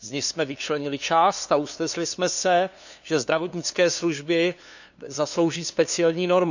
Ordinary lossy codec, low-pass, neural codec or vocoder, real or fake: none; 7.2 kHz; codec, 16 kHz, 2 kbps, FunCodec, trained on LibriTTS, 25 frames a second; fake